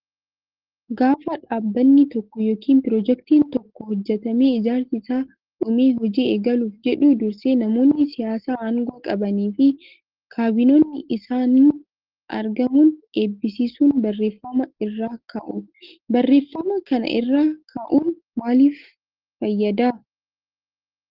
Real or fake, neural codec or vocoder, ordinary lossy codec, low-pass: real; none; Opus, 16 kbps; 5.4 kHz